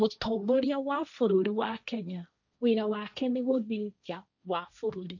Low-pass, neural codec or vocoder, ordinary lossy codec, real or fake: 7.2 kHz; codec, 16 kHz, 1.1 kbps, Voila-Tokenizer; none; fake